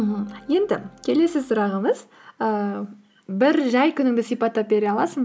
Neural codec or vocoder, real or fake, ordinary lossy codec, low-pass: none; real; none; none